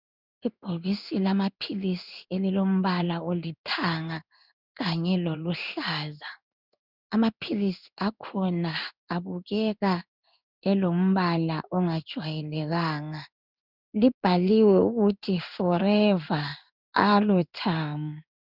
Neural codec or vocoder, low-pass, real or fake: codec, 16 kHz in and 24 kHz out, 1 kbps, XY-Tokenizer; 5.4 kHz; fake